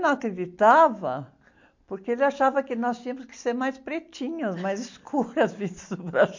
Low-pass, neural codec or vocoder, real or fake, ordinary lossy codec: 7.2 kHz; none; real; MP3, 48 kbps